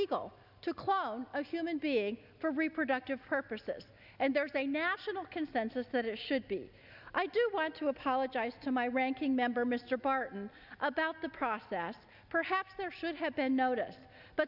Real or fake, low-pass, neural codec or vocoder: real; 5.4 kHz; none